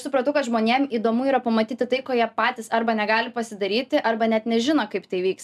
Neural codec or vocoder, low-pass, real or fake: none; 14.4 kHz; real